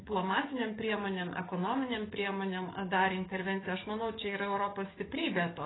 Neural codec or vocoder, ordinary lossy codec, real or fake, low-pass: codec, 44.1 kHz, 7.8 kbps, DAC; AAC, 16 kbps; fake; 7.2 kHz